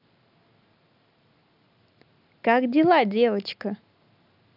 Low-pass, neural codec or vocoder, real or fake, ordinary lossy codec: 5.4 kHz; none; real; none